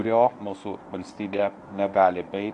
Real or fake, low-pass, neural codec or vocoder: fake; 10.8 kHz; codec, 24 kHz, 0.9 kbps, WavTokenizer, medium speech release version 1